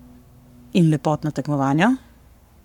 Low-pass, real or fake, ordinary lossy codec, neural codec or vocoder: 19.8 kHz; fake; none; codec, 44.1 kHz, 7.8 kbps, Pupu-Codec